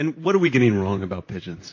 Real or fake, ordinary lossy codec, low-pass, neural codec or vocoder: fake; MP3, 32 kbps; 7.2 kHz; vocoder, 44.1 kHz, 128 mel bands, Pupu-Vocoder